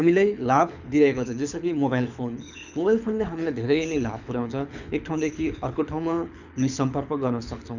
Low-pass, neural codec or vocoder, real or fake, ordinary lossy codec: 7.2 kHz; codec, 24 kHz, 6 kbps, HILCodec; fake; none